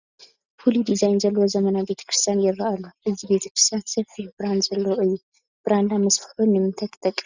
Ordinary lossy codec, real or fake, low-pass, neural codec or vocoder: Opus, 64 kbps; real; 7.2 kHz; none